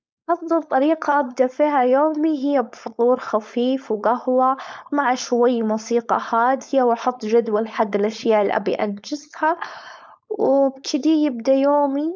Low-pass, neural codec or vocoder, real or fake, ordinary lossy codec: none; codec, 16 kHz, 4.8 kbps, FACodec; fake; none